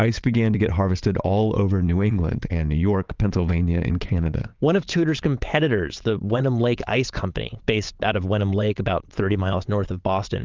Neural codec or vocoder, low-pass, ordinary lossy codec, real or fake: vocoder, 22.05 kHz, 80 mel bands, WaveNeXt; 7.2 kHz; Opus, 24 kbps; fake